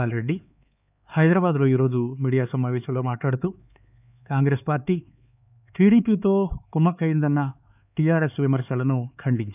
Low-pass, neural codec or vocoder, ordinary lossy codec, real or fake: 3.6 kHz; codec, 16 kHz, 4 kbps, X-Codec, HuBERT features, trained on LibriSpeech; none; fake